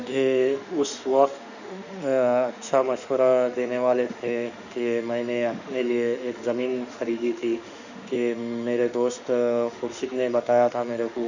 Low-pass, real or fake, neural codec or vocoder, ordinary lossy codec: 7.2 kHz; fake; autoencoder, 48 kHz, 32 numbers a frame, DAC-VAE, trained on Japanese speech; none